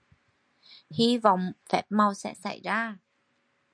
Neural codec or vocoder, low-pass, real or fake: none; 9.9 kHz; real